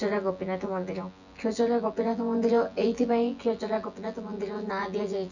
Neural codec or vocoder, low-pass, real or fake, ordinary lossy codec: vocoder, 24 kHz, 100 mel bands, Vocos; 7.2 kHz; fake; none